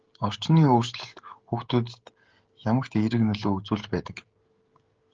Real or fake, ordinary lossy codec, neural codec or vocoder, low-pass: fake; Opus, 16 kbps; codec, 16 kHz, 16 kbps, FreqCodec, smaller model; 7.2 kHz